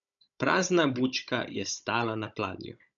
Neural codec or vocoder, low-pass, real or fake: codec, 16 kHz, 16 kbps, FunCodec, trained on Chinese and English, 50 frames a second; 7.2 kHz; fake